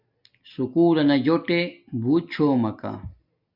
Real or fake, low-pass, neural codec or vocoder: real; 5.4 kHz; none